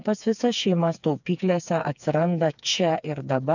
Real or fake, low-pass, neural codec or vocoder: fake; 7.2 kHz; codec, 16 kHz, 4 kbps, FreqCodec, smaller model